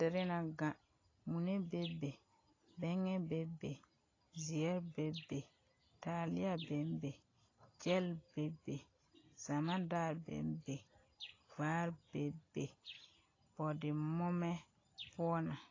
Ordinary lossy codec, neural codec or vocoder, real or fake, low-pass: AAC, 32 kbps; none; real; 7.2 kHz